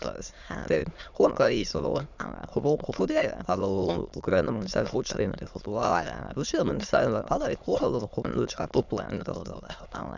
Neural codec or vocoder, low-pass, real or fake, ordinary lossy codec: autoencoder, 22.05 kHz, a latent of 192 numbers a frame, VITS, trained on many speakers; 7.2 kHz; fake; none